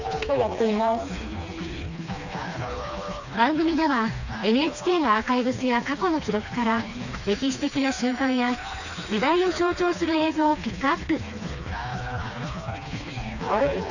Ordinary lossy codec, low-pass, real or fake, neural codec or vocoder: none; 7.2 kHz; fake; codec, 16 kHz, 2 kbps, FreqCodec, smaller model